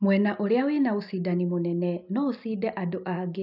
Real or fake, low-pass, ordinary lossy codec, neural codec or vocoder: real; 5.4 kHz; none; none